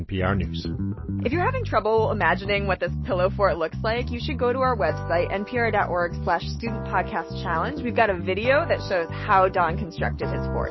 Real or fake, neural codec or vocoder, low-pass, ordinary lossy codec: real; none; 7.2 kHz; MP3, 24 kbps